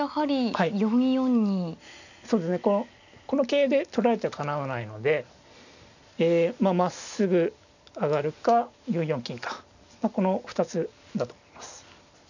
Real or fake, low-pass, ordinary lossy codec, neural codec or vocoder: real; 7.2 kHz; none; none